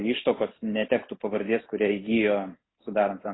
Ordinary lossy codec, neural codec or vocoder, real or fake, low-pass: AAC, 16 kbps; none; real; 7.2 kHz